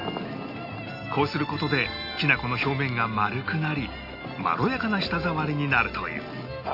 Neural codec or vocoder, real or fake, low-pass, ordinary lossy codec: none; real; 5.4 kHz; none